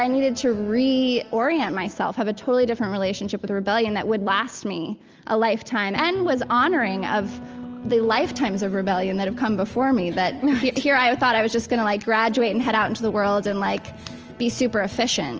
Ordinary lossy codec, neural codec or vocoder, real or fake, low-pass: Opus, 24 kbps; none; real; 7.2 kHz